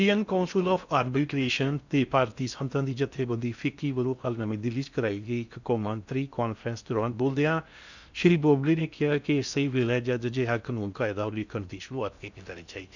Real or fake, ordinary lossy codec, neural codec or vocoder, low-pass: fake; none; codec, 16 kHz in and 24 kHz out, 0.6 kbps, FocalCodec, streaming, 4096 codes; 7.2 kHz